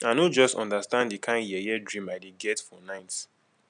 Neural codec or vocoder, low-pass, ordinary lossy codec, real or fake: none; 9.9 kHz; none; real